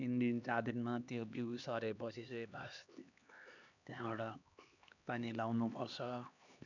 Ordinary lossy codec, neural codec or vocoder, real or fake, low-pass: none; codec, 16 kHz, 2 kbps, X-Codec, HuBERT features, trained on LibriSpeech; fake; 7.2 kHz